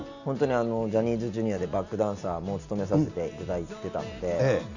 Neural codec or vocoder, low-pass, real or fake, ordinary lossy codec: none; 7.2 kHz; real; none